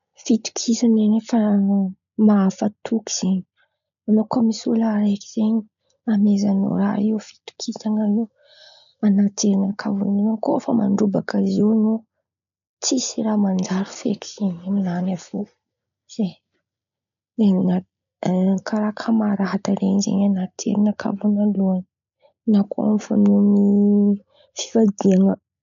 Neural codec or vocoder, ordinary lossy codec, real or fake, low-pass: none; none; real; 7.2 kHz